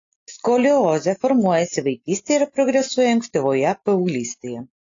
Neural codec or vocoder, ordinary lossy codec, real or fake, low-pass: none; AAC, 48 kbps; real; 7.2 kHz